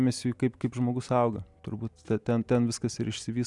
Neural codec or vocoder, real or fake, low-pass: none; real; 10.8 kHz